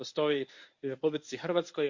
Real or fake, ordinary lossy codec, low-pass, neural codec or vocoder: fake; MP3, 48 kbps; 7.2 kHz; codec, 24 kHz, 0.9 kbps, WavTokenizer, medium speech release version 1